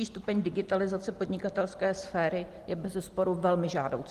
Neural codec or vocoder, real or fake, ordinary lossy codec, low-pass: none; real; Opus, 24 kbps; 14.4 kHz